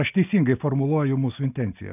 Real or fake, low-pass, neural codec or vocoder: real; 3.6 kHz; none